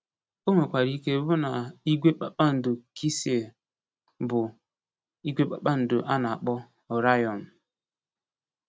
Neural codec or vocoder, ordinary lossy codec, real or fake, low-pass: none; none; real; none